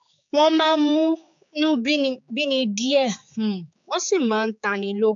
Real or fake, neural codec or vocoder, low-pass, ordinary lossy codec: fake; codec, 16 kHz, 4 kbps, X-Codec, HuBERT features, trained on general audio; 7.2 kHz; AAC, 64 kbps